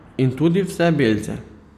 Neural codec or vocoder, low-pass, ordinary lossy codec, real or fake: none; 14.4 kHz; none; real